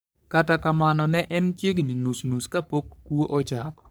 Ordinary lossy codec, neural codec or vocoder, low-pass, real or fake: none; codec, 44.1 kHz, 3.4 kbps, Pupu-Codec; none; fake